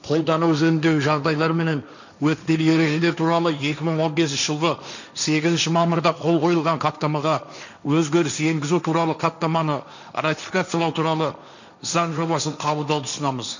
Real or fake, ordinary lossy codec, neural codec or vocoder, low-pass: fake; none; codec, 16 kHz, 1.1 kbps, Voila-Tokenizer; 7.2 kHz